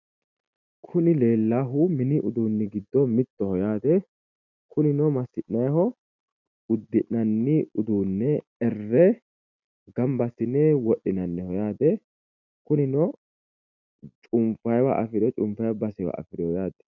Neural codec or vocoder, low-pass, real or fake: none; 7.2 kHz; real